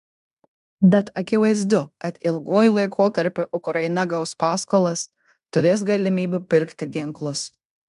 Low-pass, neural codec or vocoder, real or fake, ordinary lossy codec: 10.8 kHz; codec, 16 kHz in and 24 kHz out, 0.9 kbps, LongCat-Audio-Codec, fine tuned four codebook decoder; fake; MP3, 96 kbps